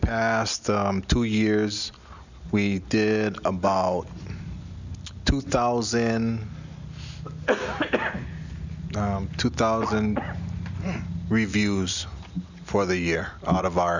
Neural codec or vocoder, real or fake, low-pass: none; real; 7.2 kHz